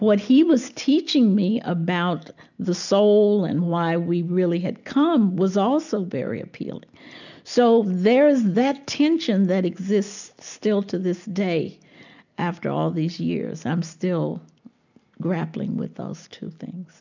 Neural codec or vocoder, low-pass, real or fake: none; 7.2 kHz; real